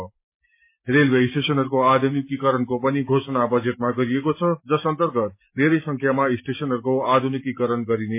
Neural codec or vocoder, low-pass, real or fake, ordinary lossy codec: none; 3.6 kHz; real; MP3, 24 kbps